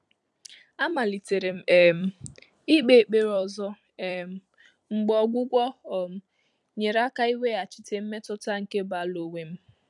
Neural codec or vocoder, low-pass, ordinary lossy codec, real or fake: vocoder, 44.1 kHz, 128 mel bands every 256 samples, BigVGAN v2; 10.8 kHz; none; fake